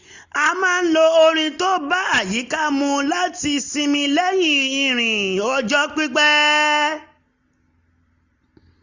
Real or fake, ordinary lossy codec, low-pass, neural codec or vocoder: real; Opus, 64 kbps; 7.2 kHz; none